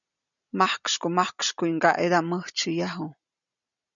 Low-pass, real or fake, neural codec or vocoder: 7.2 kHz; real; none